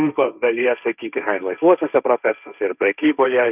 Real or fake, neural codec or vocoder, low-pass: fake; codec, 16 kHz, 1.1 kbps, Voila-Tokenizer; 3.6 kHz